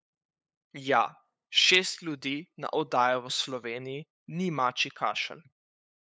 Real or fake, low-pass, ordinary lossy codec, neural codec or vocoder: fake; none; none; codec, 16 kHz, 8 kbps, FunCodec, trained on LibriTTS, 25 frames a second